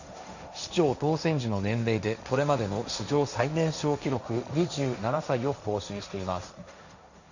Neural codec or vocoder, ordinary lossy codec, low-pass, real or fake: codec, 16 kHz, 1.1 kbps, Voila-Tokenizer; none; 7.2 kHz; fake